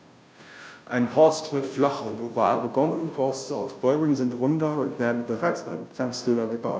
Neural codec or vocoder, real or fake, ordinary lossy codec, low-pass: codec, 16 kHz, 0.5 kbps, FunCodec, trained on Chinese and English, 25 frames a second; fake; none; none